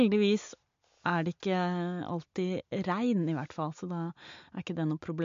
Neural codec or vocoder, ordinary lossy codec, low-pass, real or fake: none; MP3, 48 kbps; 7.2 kHz; real